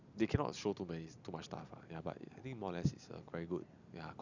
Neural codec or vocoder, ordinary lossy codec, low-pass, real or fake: none; none; 7.2 kHz; real